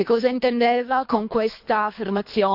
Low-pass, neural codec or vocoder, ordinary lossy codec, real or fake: 5.4 kHz; codec, 24 kHz, 3 kbps, HILCodec; AAC, 48 kbps; fake